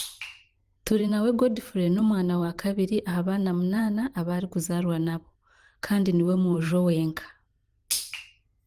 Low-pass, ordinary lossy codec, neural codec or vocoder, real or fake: 14.4 kHz; Opus, 24 kbps; vocoder, 44.1 kHz, 128 mel bands every 512 samples, BigVGAN v2; fake